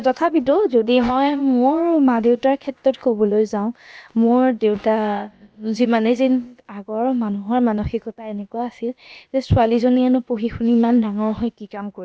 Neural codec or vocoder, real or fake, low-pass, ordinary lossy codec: codec, 16 kHz, about 1 kbps, DyCAST, with the encoder's durations; fake; none; none